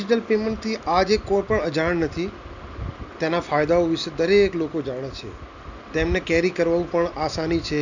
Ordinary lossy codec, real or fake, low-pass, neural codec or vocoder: none; real; 7.2 kHz; none